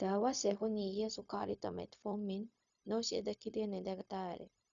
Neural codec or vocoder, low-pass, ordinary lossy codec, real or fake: codec, 16 kHz, 0.4 kbps, LongCat-Audio-Codec; 7.2 kHz; none; fake